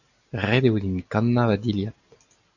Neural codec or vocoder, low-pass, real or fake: none; 7.2 kHz; real